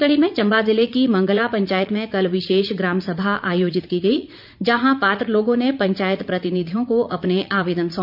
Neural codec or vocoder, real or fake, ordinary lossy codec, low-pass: vocoder, 44.1 kHz, 80 mel bands, Vocos; fake; none; 5.4 kHz